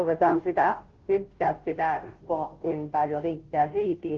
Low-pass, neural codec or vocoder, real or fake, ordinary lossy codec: 7.2 kHz; codec, 16 kHz, 0.5 kbps, FunCodec, trained on Chinese and English, 25 frames a second; fake; Opus, 16 kbps